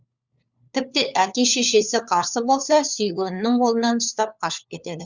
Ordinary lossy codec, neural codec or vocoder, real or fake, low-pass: Opus, 64 kbps; codec, 16 kHz, 4 kbps, FunCodec, trained on LibriTTS, 50 frames a second; fake; 7.2 kHz